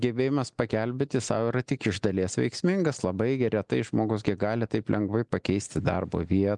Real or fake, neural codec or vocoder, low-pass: real; none; 10.8 kHz